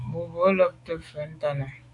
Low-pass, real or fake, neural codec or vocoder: 10.8 kHz; fake; autoencoder, 48 kHz, 128 numbers a frame, DAC-VAE, trained on Japanese speech